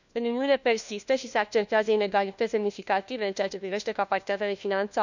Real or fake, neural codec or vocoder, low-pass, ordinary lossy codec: fake; codec, 16 kHz, 1 kbps, FunCodec, trained on LibriTTS, 50 frames a second; 7.2 kHz; none